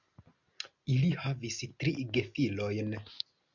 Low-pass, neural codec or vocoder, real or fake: 7.2 kHz; none; real